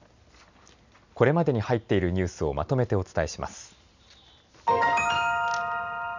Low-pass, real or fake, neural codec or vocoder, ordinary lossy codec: 7.2 kHz; real; none; none